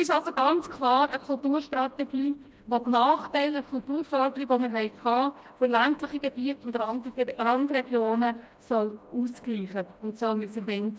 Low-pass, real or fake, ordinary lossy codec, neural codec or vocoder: none; fake; none; codec, 16 kHz, 1 kbps, FreqCodec, smaller model